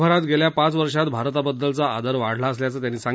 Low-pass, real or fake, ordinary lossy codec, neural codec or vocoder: none; real; none; none